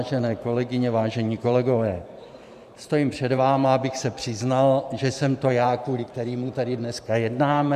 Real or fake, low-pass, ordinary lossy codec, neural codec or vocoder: fake; 14.4 kHz; MP3, 96 kbps; vocoder, 44.1 kHz, 128 mel bands every 512 samples, BigVGAN v2